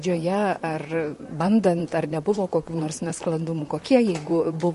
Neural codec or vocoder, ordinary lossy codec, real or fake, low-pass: vocoder, 44.1 kHz, 128 mel bands, Pupu-Vocoder; MP3, 48 kbps; fake; 14.4 kHz